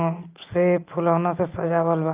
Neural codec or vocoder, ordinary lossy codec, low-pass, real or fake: none; Opus, 32 kbps; 3.6 kHz; real